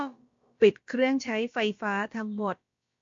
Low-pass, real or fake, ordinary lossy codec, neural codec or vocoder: 7.2 kHz; fake; MP3, 48 kbps; codec, 16 kHz, about 1 kbps, DyCAST, with the encoder's durations